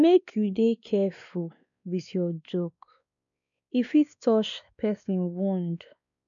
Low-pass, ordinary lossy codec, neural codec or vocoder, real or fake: 7.2 kHz; none; codec, 16 kHz, 2 kbps, X-Codec, WavLM features, trained on Multilingual LibriSpeech; fake